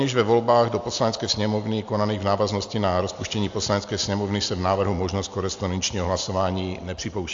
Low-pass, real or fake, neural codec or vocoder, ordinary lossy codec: 7.2 kHz; real; none; AAC, 64 kbps